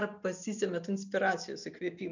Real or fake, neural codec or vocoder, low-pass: real; none; 7.2 kHz